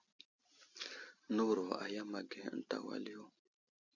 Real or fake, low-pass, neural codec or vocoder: real; 7.2 kHz; none